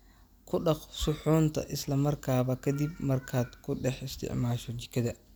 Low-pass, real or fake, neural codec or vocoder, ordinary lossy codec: none; real; none; none